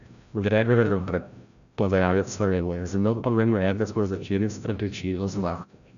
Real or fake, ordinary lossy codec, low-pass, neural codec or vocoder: fake; none; 7.2 kHz; codec, 16 kHz, 0.5 kbps, FreqCodec, larger model